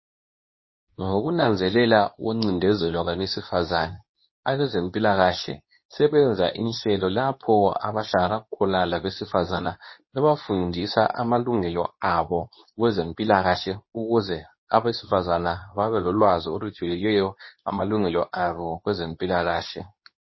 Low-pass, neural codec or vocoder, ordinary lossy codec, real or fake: 7.2 kHz; codec, 24 kHz, 0.9 kbps, WavTokenizer, medium speech release version 2; MP3, 24 kbps; fake